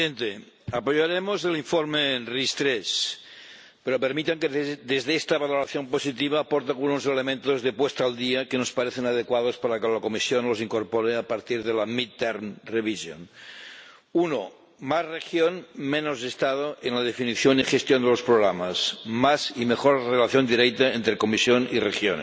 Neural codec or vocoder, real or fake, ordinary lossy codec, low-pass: none; real; none; none